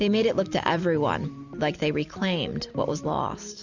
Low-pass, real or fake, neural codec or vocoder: 7.2 kHz; real; none